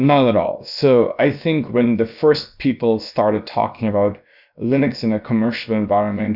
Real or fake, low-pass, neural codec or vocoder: fake; 5.4 kHz; codec, 16 kHz, about 1 kbps, DyCAST, with the encoder's durations